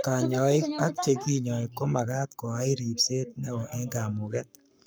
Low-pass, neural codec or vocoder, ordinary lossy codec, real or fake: none; codec, 44.1 kHz, 7.8 kbps, Pupu-Codec; none; fake